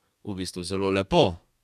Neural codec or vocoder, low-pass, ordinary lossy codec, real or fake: codec, 32 kHz, 1.9 kbps, SNAC; 14.4 kHz; none; fake